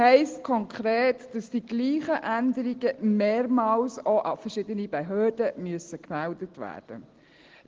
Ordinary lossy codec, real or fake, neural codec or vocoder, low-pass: Opus, 16 kbps; real; none; 7.2 kHz